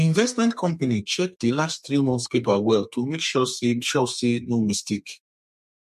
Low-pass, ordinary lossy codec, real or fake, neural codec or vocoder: 14.4 kHz; MP3, 64 kbps; fake; codec, 32 kHz, 1.9 kbps, SNAC